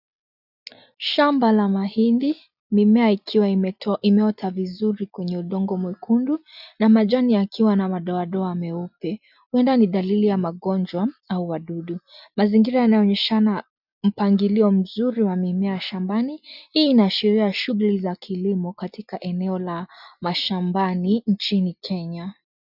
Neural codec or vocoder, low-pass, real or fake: none; 5.4 kHz; real